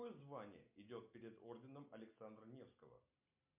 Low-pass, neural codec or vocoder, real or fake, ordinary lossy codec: 3.6 kHz; none; real; MP3, 32 kbps